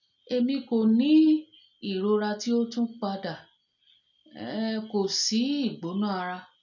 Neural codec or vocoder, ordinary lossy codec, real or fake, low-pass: none; none; real; 7.2 kHz